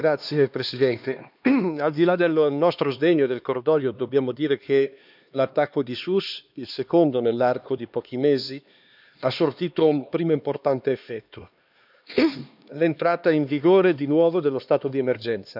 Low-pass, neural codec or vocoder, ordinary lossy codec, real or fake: 5.4 kHz; codec, 16 kHz, 2 kbps, X-Codec, HuBERT features, trained on LibriSpeech; none; fake